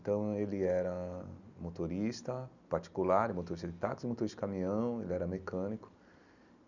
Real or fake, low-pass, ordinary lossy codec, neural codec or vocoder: real; 7.2 kHz; none; none